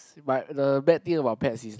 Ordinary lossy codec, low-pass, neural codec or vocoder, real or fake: none; none; none; real